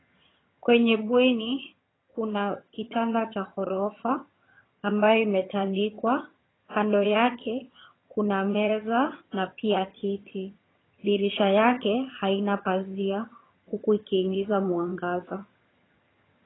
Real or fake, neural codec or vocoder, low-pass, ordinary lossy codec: fake; vocoder, 22.05 kHz, 80 mel bands, HiFi-GAN; 7.2 kHz; AAC, 16 kbps